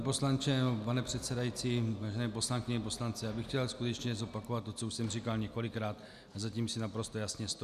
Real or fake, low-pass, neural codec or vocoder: real; 14.4 kHz; none